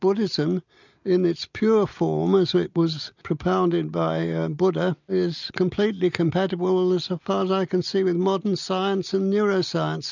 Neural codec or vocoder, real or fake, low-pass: none; real; 7.2 kHz